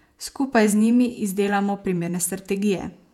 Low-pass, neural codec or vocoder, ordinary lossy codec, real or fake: 19.8 kHz; vocoder, 48 kHz, 128 mel bands, Vocos; none; fake